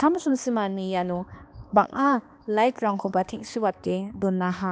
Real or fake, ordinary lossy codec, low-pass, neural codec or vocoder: fake; none; none; codec, 16 kHz, 2 kbps, X-Codec, HuBERT features, trained on balanced general audio